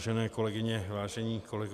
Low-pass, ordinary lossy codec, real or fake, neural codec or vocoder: 14.4 kHz; MP3, 96 kbps; fake; vocoder, 44.1 kHz, 128 mel bands every 256 samples, BigVGAN v2